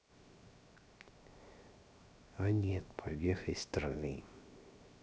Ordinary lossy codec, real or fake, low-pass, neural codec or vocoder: none; fake; none; codec, 16 kHz, 0.7 kbps, FocalCodec